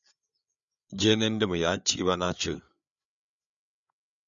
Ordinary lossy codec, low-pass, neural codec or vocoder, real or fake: MP3, 96 kbps; 7.2 kHz; codec, 16 kHz, 8 kbps, FreqCodec, larger model; fake